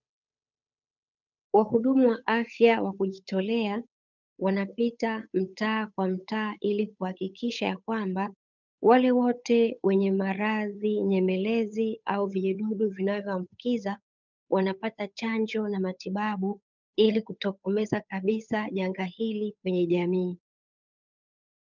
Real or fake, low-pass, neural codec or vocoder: fake; 7.2 kHz; codec, 16 kHz, 8 kbps, FunCodec, trained on Chinese and English, 25 frames a second